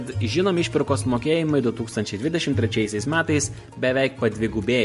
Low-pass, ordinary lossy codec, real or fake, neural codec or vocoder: 14.4 kHz; MP3, 48 kbps; real; none